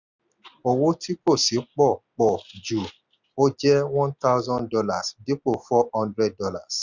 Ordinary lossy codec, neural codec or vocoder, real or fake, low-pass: none; none; real; 7.2 kHz